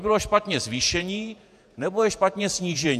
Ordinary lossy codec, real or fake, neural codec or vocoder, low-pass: AAC, 96 kbps; fake; vocoder, 48 kHz, 128 mel bands, Vocos; 14.4 kHz